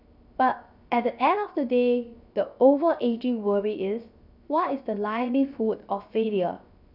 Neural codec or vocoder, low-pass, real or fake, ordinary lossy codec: codec, 16 kHz, 0.7 kbps, FocalCodec; 5.4 kHz; fake; none